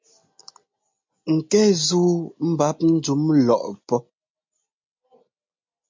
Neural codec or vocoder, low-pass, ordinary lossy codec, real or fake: none; 7.2 kHz; MP3, 64 kbps; real